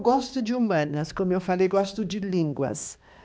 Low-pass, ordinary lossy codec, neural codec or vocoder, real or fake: none; none; codec, 16 kHz, 2 kbps, X-Codec, HuBERT features, trained on balanced general audio; fake